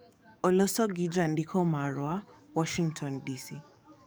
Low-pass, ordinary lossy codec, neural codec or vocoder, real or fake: none; none; codec, 44.1 kHz, 7.8 kbps, DAC; fake